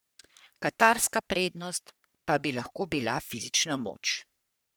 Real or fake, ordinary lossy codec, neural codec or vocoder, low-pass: fake; none; codec, 44.1 kHz, 3.4 kbps, Pupu-Codec; none